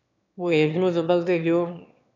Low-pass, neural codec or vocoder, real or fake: 7.2 kHz; autoencoder, 22.05 kHz, a latent of 192 numbers a frame, VITS, trained on one speaker; fake